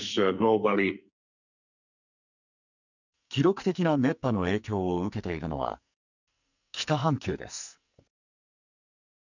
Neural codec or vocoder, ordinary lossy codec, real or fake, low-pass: codec, 44.1 kHz, 2.6 kbps, SNAC; none; fake; 7.2 kHz